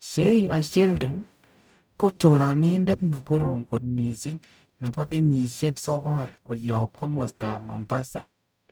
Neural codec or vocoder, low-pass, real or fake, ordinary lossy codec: codec, 44.1 kHz, 0.9 kbps, DAC; none; fake; none